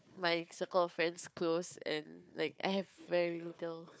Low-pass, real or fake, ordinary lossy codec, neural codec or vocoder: none; fake; none; codec, 16 kHz, 4 kbps, FunCodec, trained on Chinese and English, 50 frames a second